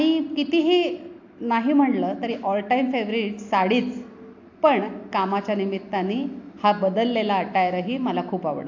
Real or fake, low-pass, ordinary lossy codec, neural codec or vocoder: real; 7.2 kHz; AAC, 48 kbps; none